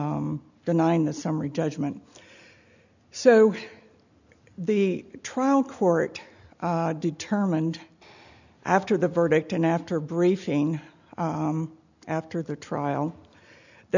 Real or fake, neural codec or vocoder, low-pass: real; none; 7.2 kHz